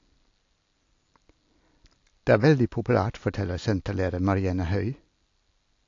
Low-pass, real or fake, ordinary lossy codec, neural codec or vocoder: 7.2 kHz; real; AAC, 48 kbps; none